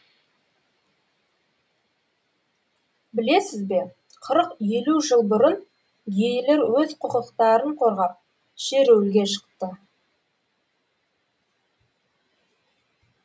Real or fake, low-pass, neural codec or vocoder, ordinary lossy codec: real; none; none; none